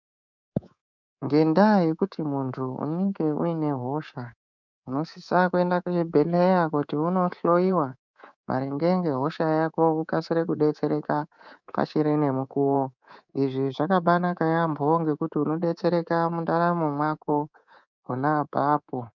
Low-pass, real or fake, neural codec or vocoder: 7.2 kHz; fake; codec, 24 kHz, 3.1 kbps, DualCodec